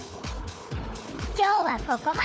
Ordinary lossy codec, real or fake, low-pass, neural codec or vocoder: none; fake; none; codec, 16 kHz, 4 kbps, FunCodec, trained on Chinese and English, 50 frames a second